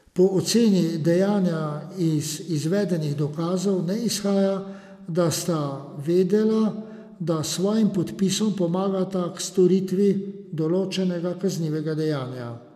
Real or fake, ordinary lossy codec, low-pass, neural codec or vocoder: real; none; 14.4 kHz; none